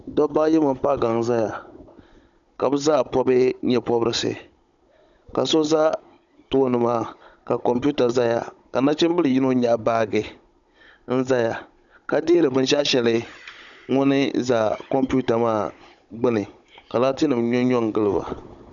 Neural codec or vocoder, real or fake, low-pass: codec, 16 kHz, 16 kbps, FunCodec, trained on Chinese and English, 50 frames a second; fake; 7.2 kHz